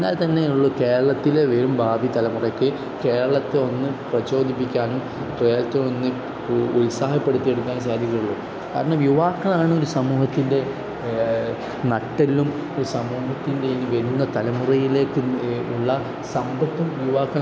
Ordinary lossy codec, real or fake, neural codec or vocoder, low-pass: none; real; none; none